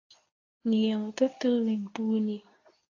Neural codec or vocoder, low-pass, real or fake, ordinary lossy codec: codec, 24 kHz, 0.9 kbps, WavTokenizer, medium speech release version 2; 7.2 kHz; fake; AAC, 32 kbps